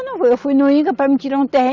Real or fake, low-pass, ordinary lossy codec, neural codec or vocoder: real; 7.2 kHz; Opus, 64 kbps; none